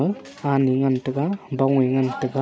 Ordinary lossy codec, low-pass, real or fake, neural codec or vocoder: none; none; real; none